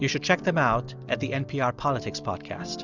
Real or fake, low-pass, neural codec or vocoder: real; 7.2 kHz; none